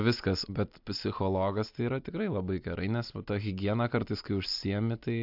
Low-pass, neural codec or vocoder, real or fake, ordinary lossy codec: 5.4 kHz; none; real; AAC, 48 kbps